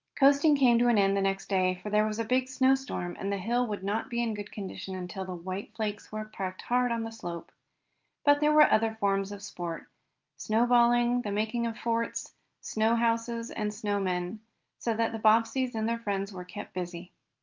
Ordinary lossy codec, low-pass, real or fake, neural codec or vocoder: Opus, 24 kbps; 7.2 kHz; real; none